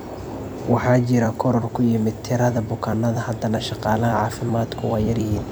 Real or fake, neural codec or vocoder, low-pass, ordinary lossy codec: fake; vocoder, 44.1 kHz, 128 mel bands every 256 samples, BigVGAN v2; none; none